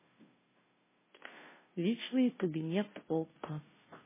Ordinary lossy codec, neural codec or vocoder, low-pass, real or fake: MP3, 16 kbps; codec, 16 kHz, 0.5 kbps, FunCodec, trained on Chinese and English, 25 frames a second; 3.6 kHz; fake